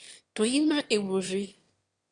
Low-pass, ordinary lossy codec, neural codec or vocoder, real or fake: 9.9 kHz; Opus, 64 kbps; autoencoder, 22.05 kHz, a latent of 192 numbers a frame, VITS, trained on one speaker; fake